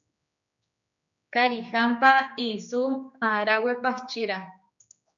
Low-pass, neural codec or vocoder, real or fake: 7.2 kHz; codec, 16 kHz, 2 kbps, X-Codec, HuBERT features, trained on general audio; fake